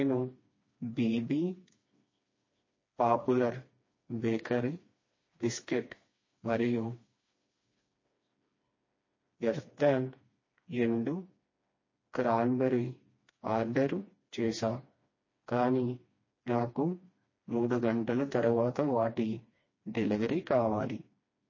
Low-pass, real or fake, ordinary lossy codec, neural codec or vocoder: 7.2 kHz; fake; MP3, 32 kbps; codec, 16 kHz, 2 kbps, FreqCodec, smaller model